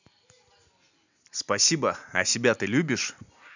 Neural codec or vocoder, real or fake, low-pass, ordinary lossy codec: none; real; 7.2 kHz; none